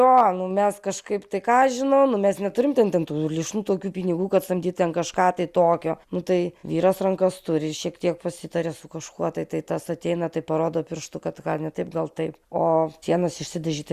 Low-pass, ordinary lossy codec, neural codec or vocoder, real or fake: 14.4 kHz; Opus, 64 kbps; none; real